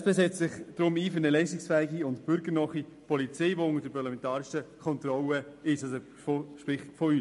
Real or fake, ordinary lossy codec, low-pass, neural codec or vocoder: real; MP3, 48 kbps; 14.4 kHz; none